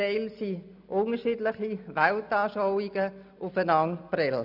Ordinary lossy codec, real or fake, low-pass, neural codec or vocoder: none; real; 5.4 kHz; none